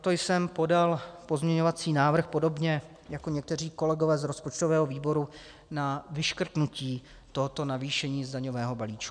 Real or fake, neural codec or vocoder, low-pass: real; none; 9.9 kHz